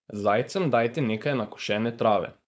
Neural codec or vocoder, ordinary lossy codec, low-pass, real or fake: codec, 16 kHz, 4.8 kbps, FACodec; none; none; fake